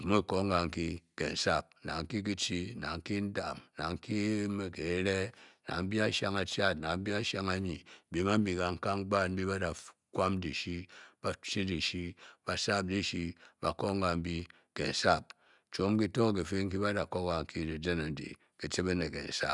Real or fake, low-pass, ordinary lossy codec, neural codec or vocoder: real; 10.8 kHz; none; none